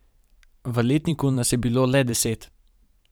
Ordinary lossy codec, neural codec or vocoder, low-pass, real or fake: none; vocoder, 44.1 kHz, 128 mel bands every 512 samples, BigVGAN v2; none; fake